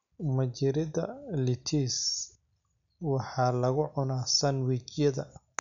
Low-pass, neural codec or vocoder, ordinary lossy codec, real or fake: 7.2 kHz; none; none; real